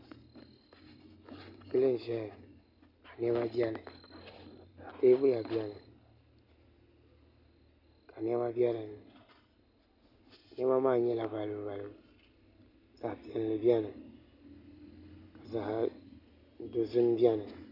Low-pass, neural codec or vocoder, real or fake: 5.4 kHz; none; real